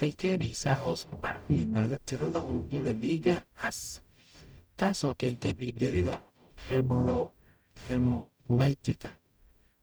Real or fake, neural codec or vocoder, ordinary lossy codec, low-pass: fake; codec, 44.1 kHz, 0.9 kbps, DAC; none; none